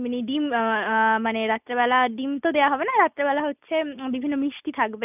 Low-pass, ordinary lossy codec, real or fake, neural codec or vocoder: 3.6 kHz; none; real; none